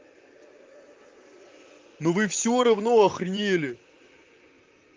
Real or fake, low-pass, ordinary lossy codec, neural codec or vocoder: fake; 7.2 kHz; Opus, 32 kbps; vocoder, 44.1 kHz, 128 mel bands, Pupu-Vocoder